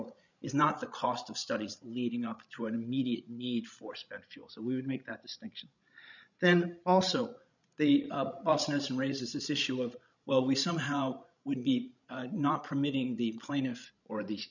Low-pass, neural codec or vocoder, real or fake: 7.2 kHz; codec, 16 kHz, 16 kbps, FreqCodec, larger model; fake